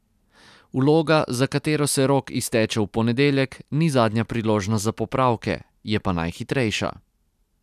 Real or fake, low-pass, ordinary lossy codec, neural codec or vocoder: real; 14.4 kHz; none; none